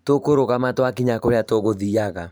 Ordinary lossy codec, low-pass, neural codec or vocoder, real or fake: none; none; vocoder, 44.1 kHz, 128 mel bands every 512 samples, BigVGAN v2; fake